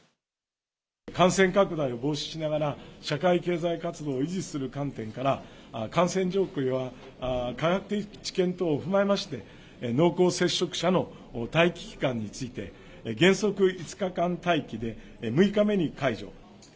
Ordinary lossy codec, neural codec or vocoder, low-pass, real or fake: none; none; none; real